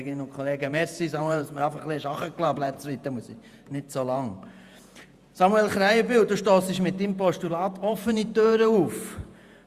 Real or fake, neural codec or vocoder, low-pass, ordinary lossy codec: fake; vocoder, 48 kHz, 128 mel bands, Vocos; 14.4 kHz; Opus, 64 kbps